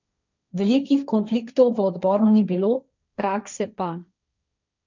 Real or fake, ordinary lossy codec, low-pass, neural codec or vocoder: fake; none; 7.2 kHz; codec, 16 kHz, 1.1 kbps, Voila-Tokenizer